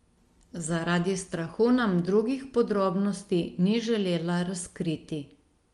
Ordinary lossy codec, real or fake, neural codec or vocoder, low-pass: Opus, 32 kbps; real; none; 10.8 kHz